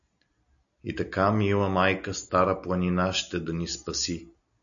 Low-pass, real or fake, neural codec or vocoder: 7.2 kHz; real; none